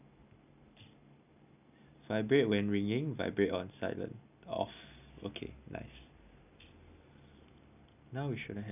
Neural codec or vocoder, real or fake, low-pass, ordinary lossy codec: none; real; 3.6 kHz; none